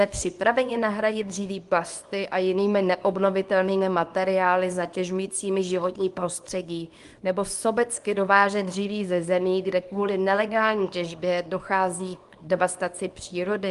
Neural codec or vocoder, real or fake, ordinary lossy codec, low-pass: codec, 24 kHz, 0.9 kbps, WavTokenizer, small release; fake; Opus, 32 kbps; 10.8 kHz